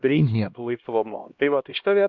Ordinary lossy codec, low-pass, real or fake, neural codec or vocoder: MP3, 64 kbps; 7.2 kHz; fake; codec, 16 kHz, 1 kbps, X-Codec, HuBERT features, trained on LibriSpeech